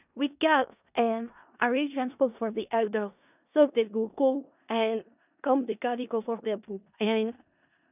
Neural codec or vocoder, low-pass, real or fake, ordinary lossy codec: codec, 16 kHz in and 24 kHz out, 0.4 kbps, LongCat-Audio-Codec, four codebook decoder; 3.6 kHz; fake; none